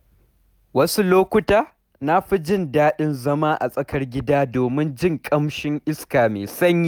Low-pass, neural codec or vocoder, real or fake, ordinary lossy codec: none; none; real; none